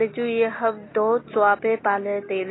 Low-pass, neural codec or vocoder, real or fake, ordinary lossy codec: 7.2 kHz; none; real; AAC, 16 kbps